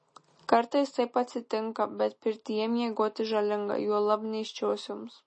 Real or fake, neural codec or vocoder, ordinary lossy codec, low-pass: real; none; MP3, 32 kbps; 10.8 kHz